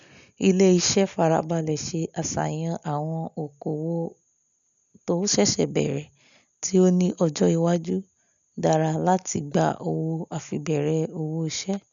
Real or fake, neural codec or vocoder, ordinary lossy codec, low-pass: real; none; none; 7.2 kHz